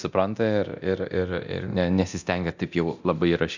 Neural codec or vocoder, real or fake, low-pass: codec, 24 kHz, 0.9 kbps, DualCodec; fake; 7.2 kHz